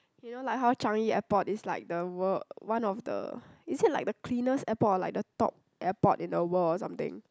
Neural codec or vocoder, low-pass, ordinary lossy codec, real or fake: none; none; none; real